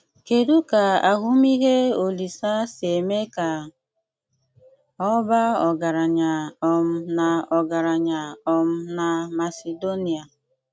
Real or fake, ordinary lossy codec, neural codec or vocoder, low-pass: real; none; none; none